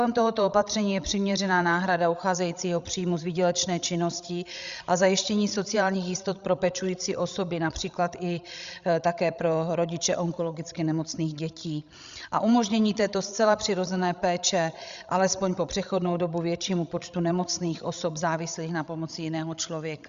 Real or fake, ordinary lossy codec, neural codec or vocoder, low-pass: fake; Opus, 64 kbps; codec, 16 kHz, 16 kbps, FreqCodec, larger model; 7.2 kHz